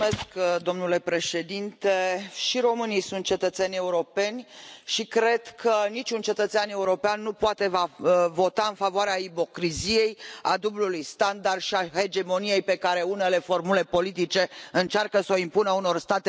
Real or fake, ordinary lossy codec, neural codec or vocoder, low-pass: real; none; none; none